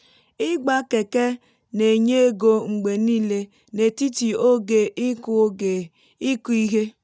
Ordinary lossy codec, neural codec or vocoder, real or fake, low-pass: none; none; real; none